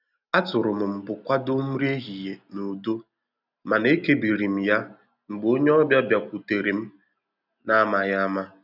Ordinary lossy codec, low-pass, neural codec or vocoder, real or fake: none; 5.4 kHz; none; real